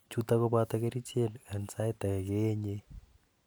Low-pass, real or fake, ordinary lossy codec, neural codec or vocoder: none; real; none; none